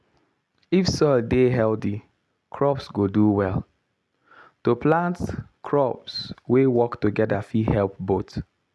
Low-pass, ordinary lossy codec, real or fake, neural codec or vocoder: none; none; real; none